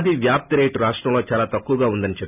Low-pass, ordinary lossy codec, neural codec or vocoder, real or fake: 3.6 kHz; none; none; real